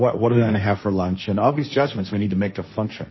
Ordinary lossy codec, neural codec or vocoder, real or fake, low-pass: MP3, 24 kbps; codec, 16 kHz, 1.1 kbps, Voila-Tokenizer; fake; 7.2 kHz